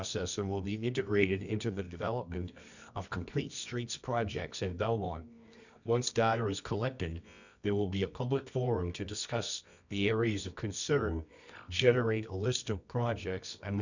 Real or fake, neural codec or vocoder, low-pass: fake; codec, 24 kHz, 0.9 kbps, WavTokenizer, medium music audio release; 7.2 kHz